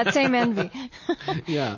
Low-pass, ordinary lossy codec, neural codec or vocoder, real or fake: 7.2 kHz; MP3, 32 kbps; none; real